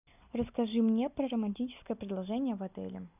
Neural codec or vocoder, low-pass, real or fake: none; 3.6 kHz; real